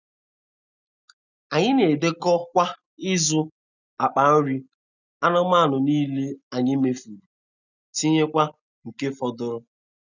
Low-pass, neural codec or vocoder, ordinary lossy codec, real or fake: 7.2 kHz; none; none; real